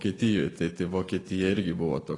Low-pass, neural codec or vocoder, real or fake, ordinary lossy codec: 10.8 kHz; vocoder, 44.1 kHz, 128 mel bands every 256 samples, BigVGAN v2; fake; AAC, 32 kbps